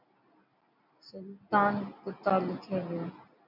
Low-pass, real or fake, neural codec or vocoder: 5.4 kHz; real; none